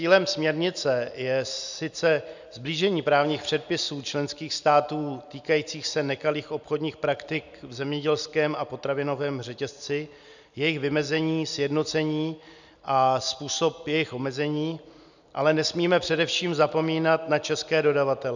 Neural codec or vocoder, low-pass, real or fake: none; 7.2 kHz; real